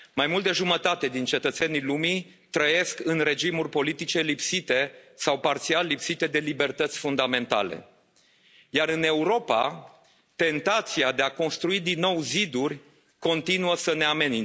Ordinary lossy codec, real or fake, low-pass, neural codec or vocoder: none; real; none; none